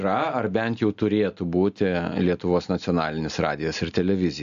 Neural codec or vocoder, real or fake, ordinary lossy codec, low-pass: none; real; AAC, 96 kbps; 7.2 kHz